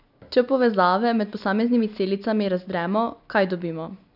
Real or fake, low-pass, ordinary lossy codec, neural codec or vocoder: real; 5.4 kHz; none; none